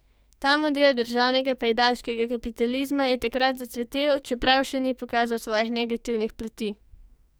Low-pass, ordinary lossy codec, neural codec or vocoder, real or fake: none; none; codec, 44.1 kHz, 2.6 kbps, SNAC; fake